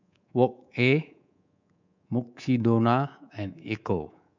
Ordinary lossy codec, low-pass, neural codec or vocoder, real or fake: none; 7.2 kHz; codec, 24 kHz, 3.1 kbps, DualCodec; fake